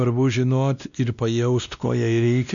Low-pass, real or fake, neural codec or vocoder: 7.2 kHz; fake; codec, 16 kHz, 1 kbps, X-Codec, WavLM features, trained on Multilingual LibriSpeech